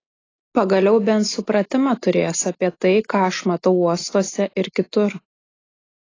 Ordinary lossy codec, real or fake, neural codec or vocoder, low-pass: AAC, 32 kbps; real; none; 7.2 kHz